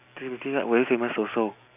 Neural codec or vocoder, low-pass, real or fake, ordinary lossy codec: none; 3.6 kHz; real; none